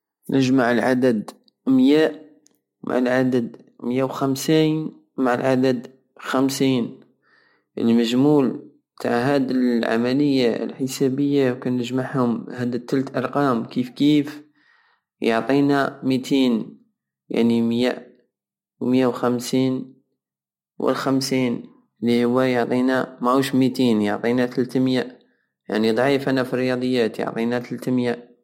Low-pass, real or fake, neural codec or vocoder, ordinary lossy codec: 19.8 kHz; real; none; MP3, 64 kbps